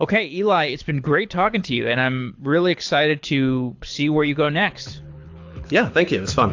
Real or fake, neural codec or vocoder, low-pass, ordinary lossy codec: fake; codec, 24 kHz, 6 kbps, HILCodec; 7.2 kHz; AAC, 48 kbps